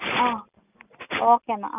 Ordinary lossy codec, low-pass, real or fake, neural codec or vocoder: none; 3.6 kHz; real; none